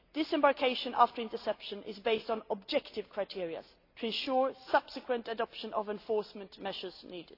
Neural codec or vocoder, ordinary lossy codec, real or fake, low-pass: none; AAC, 32 kbps; real; 5.4 kHz